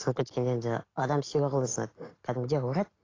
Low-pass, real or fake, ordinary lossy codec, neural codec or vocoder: 7.2 kHz; real; AAC, 32 kbps; none